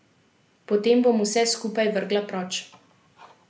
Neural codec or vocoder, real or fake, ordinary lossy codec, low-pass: none; real; none; none